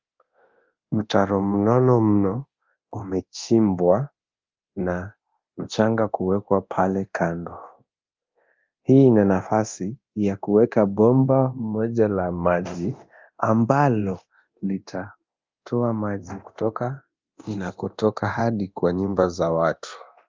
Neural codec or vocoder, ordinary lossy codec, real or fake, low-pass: codec, 24 kHz, 0.9 kbps, DualCodec; Opus, 24 kbps; fake; 7.2 kHz